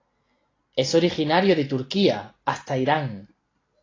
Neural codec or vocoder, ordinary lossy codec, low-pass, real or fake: none; AAC, 32 kbps; 7.2 kHz; real